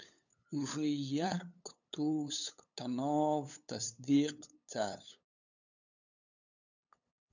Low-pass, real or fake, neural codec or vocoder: 7.2 kHz; fake; codec, 16 kHz, 8 kbps, FunCodec, trained on LibriTTS, 25 frames a second